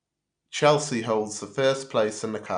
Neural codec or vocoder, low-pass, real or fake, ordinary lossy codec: none; 9.9 kHz; real; none